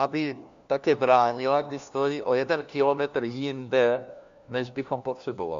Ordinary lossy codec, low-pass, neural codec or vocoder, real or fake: MP3, 64 kbps; 7.2 kHz; codec, 16 kHz, 1 kbps, FunCodec, trained on LibriTTS, 50 frames a second; fake